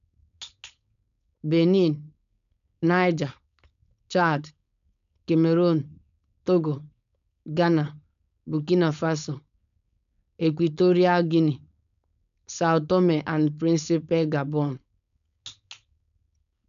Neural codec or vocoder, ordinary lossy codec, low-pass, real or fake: codec, 16 kHz, 4.8 kbps, FACodec; none; 7.2 kHz; fake